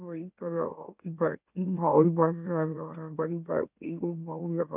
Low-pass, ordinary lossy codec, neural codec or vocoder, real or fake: 3.6 kHz; none; autoencoder, 44.1 kHz, a latent of 192 numbers a frame, MeloTTS; fake